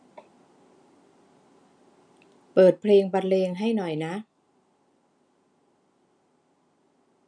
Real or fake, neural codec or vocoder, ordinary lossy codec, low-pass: real; none; none; 9.9 kHz